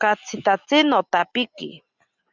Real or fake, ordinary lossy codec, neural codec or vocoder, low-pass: real; Opus, 64 kbps; none; 7.2 kHz